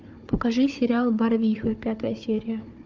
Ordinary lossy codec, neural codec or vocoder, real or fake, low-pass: Opus, 32 kbps; codec, 16 kHz, 4 kbps, FunCodec, trained on Chinese and English, 50 frames a second; fake; 7.2 kHz